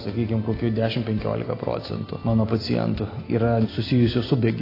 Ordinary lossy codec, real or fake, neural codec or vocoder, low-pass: AAC, 24 kbps; real; none; 5.4 kHz